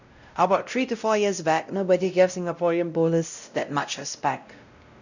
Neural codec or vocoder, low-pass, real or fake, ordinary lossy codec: codec, 16 kHz, 0.5 kbps, X-Codec, WavLM features, trained on Multilingual LibriSpeech; 7.2 kHz; fake; none